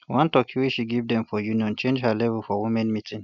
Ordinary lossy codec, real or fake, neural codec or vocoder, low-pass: none; real; none; 7.2 kHz